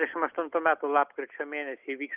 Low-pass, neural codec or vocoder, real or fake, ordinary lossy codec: 3.6 kHz; none; real; Opus, 32 kbps